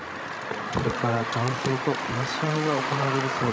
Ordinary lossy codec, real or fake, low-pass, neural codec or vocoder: none; fake; none; codec, 16 kHz, 16 kbps, FreqCodec, larger model